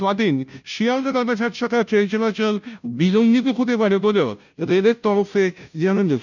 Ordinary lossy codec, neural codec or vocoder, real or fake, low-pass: none; codec, 16 kHz, 0.5 kbps, FunCodec, trained on Chinese and English, 25 frames a second; fake; 7.2 kHz